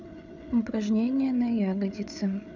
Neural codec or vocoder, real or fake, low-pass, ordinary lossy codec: codec, 16 kHz, 8 kbps, FreqCodec, larger model; fake; 7.2 kHz; none